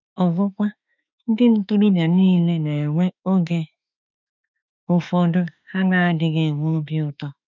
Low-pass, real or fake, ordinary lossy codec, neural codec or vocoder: 7.2 kHz; fake; none; autoencoder, 48 kHz, 32 numbers a frame, DAC-VAE, trained on Japanese speech